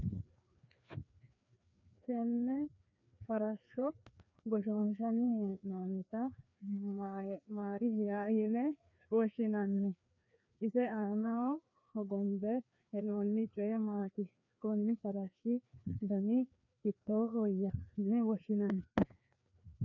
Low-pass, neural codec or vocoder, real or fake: 7.2 kHz; codec, 16 kHz, 2 kbps, FreqCodec, larger model; fake